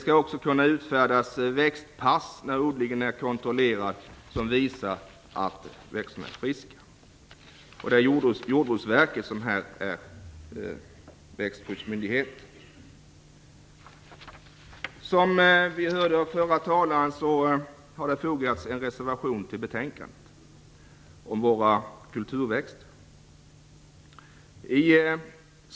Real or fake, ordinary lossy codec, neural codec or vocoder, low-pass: real; none; none; none